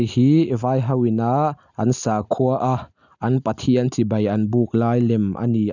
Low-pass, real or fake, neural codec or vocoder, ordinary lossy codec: 7.2 kHz; real; none; none